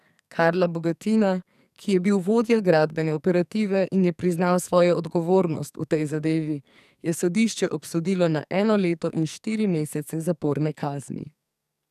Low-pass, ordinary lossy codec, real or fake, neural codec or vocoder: 14.4 kHz; none; fake; codec, 44.1 kHz, 2.6 kbps, SNAC